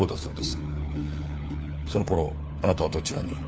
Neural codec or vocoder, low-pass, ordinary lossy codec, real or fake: codec, 16 kHz, 4 kbps, FunCodec, trained on LibriTTS, 50 frames a second; none; none; fake